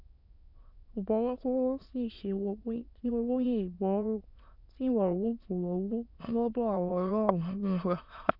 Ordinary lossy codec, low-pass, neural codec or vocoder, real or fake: none; 5.4 kHz; autoencoder, 22.05 kHz, a latent of 192 numbers a frame, VITS, trained on many speakers; fake